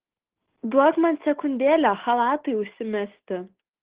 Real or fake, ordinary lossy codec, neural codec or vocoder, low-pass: real; Opus, 16 kbps; none; 3.6 kHz